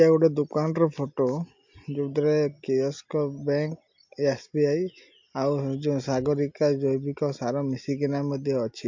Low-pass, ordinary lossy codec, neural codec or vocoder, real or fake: 7.2 kHz; MP3, 48 kbps; none; real